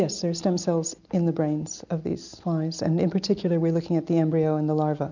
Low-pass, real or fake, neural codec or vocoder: 7.2 kHz; real; none